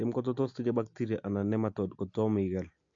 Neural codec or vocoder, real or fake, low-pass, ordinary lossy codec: none; real; 7.2 kHz; AAC, 48 kbps